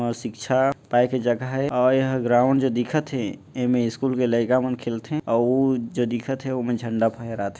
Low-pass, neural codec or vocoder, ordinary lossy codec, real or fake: none; none; none; real